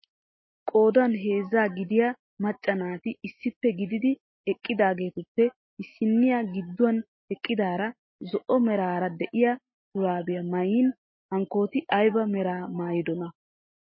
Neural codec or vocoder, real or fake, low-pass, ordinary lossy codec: none; real; 7.2 kHz; MP3, 24 kbps